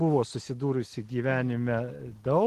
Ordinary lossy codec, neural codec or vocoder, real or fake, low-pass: Opus, 16 kbps; none; real; 14.4 kHz